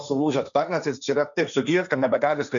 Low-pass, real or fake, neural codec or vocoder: 7.2 kHz; fake; codec, 16 kHz, 1.1 kbps, Voila-Tokenizer